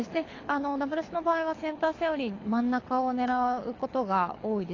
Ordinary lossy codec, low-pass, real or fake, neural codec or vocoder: AAC, 32 kbps; 7.2 kHz; fake; codec, 24 kHz, 6 kbps, HILCodec